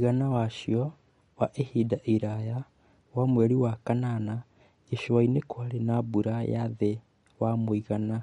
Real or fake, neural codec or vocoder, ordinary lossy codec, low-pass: real; none; MP3, 48 kbps; 10.8 kHz